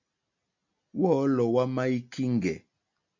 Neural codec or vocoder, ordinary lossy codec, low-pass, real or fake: none; MP3, 64 kbps; 7.2 kHz; real